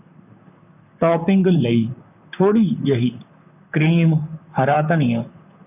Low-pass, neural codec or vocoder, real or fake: 3.6 kHz; vocoder, 44.1 kHz, 128 mel bands, Pupu-Vocoder; fake